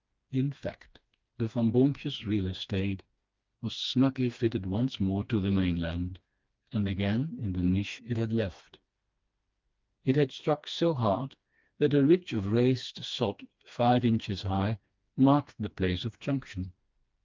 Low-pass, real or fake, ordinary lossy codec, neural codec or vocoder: 7.2 kHz; fake; Opus, 32 kbps; codec, 16 kHz, 2 kbps, FreqCodec, smaller model